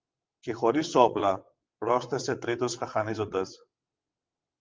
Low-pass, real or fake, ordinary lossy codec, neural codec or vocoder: 7.2 kHz; fake; Opus, 24 kbps; vocoder, 22.05 kHz, 80 mel bands, WaveNeXt